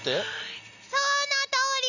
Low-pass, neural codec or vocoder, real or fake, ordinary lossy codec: 7.2 kHz; none; real; none